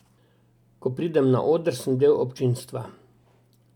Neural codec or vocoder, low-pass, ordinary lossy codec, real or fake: none; 19.8 kHz; none; real